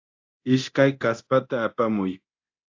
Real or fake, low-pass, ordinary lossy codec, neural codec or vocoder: fake; 7.2 kHz; AAC, 48 kbps; codec, 24 kHz, 0.9 kbps, DualCodec